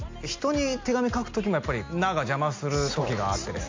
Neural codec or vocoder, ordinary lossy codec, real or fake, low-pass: none; MP3, 48 kbps; real; 7.2 kHz